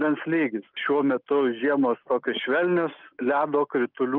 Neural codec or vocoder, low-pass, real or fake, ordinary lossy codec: none; 5.4 kHz; real; Opus, 24 kbps